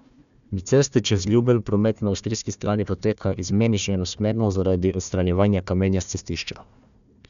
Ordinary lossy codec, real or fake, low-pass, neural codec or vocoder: none; fake; 7.2 kHz; codec, 16 kHz, 1 kbps, FunCodec, trained on Chinese and English, 50 frames a second